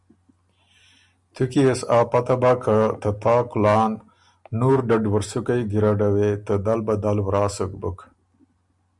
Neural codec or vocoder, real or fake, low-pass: none; real; 10.8 kHz